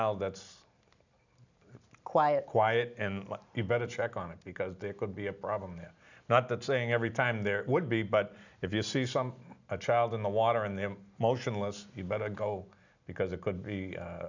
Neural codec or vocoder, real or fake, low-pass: none; real; 7.2 kHz